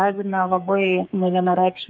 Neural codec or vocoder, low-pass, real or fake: codec, 32 kHz, 1.9 kbps, SNAC; 7.2 kHz; fake